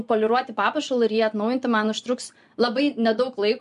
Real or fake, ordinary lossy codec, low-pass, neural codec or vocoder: fake; MP3, 64 kbps; 10.8 kHz; vocoder, 24 kHz, 100 mel bands, Vocos